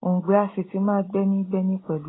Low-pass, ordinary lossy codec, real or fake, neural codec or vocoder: 7.2 kHz; AAC, 16 kbps; real; none